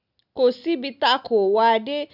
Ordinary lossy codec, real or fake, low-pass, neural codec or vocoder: none; real; 5.4 kHz; none